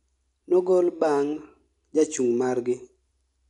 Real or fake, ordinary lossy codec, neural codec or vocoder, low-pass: real; none; none; 10.8 kHz